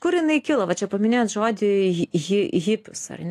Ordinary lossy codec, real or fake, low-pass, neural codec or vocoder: AAC, 64 kbps; real; 14.4 kHz; none